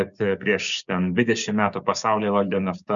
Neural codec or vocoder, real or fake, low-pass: codec, 16 kHz, 6 kbps, DAC; fake; 7.2 kHz